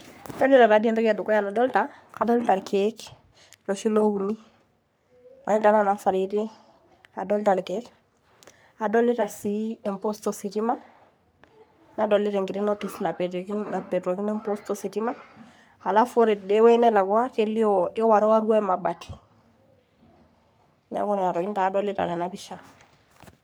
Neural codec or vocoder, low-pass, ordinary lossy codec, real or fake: codec, 44.1 kHz, 3.4 kbps, Pupu-Codec; none; none; fake